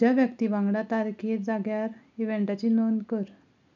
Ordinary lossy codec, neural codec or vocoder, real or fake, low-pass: none; none; real; 7.2 kHz